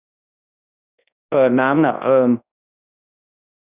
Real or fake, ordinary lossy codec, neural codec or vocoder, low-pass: fake; none; codec, 24 kHz, 0.9 kbps, WavTokenizer, large speech release; 3.6 kHz